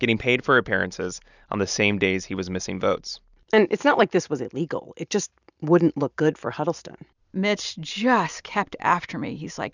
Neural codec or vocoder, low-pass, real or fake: none; 7.2 kHz; real